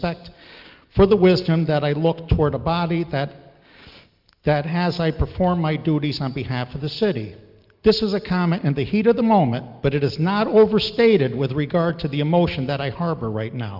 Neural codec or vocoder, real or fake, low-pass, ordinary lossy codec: none; real; 5.4 kHz; Opus, 24 kbps